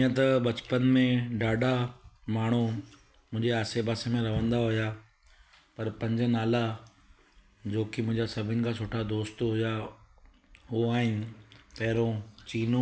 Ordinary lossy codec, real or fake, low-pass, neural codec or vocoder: none; real; none; none